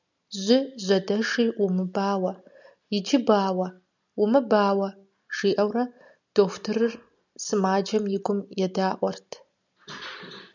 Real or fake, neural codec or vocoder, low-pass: real; none; 7.2 kHz